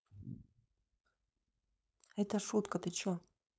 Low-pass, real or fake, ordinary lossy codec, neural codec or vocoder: none; fake; none; codec, 16 kHz, 4.8 kbps, FACodec